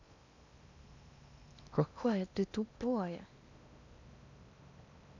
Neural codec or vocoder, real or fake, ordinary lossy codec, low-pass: codec, 16 kHz in and 24 kHz out, 0.8 kbps, FocalCodec, streaming, 65536 codes; fake; none; 7.2 kHz